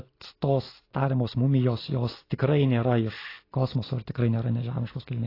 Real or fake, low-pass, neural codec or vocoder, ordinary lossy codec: real; 5.4 kHz; none; AAC, 24 kbps